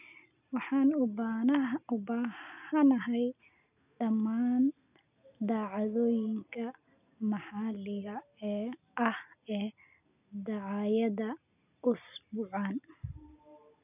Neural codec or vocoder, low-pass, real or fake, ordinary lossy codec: none; 3.6 kHz; real; none